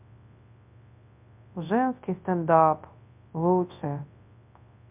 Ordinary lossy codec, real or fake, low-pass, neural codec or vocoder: MP3, 32 kbps; fake; 3.6 kHz; codec, 24 kHz, 0.9 kbps, WavTokenizer, large speech release